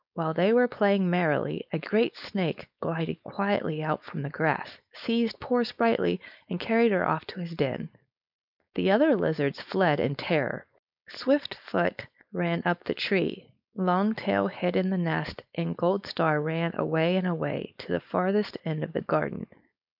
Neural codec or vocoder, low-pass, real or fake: codec, 16 kHz, 4.8 kbps, FACodec; 5.4 kHz; fake